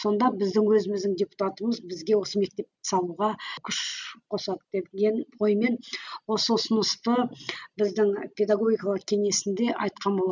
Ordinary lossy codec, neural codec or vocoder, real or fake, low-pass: none; none; real; 7.2 kHz